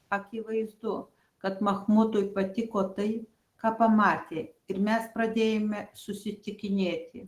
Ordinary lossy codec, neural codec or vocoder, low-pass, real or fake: Opus, 16 kbps; none; 14.4 kHz; real